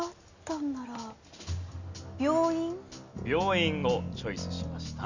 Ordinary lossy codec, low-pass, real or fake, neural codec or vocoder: none; 7.2 kHz; real; none